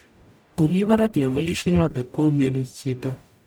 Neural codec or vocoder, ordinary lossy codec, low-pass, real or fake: codec, 44.1 kHz, 0.9 kbps, DAC; none; none; fake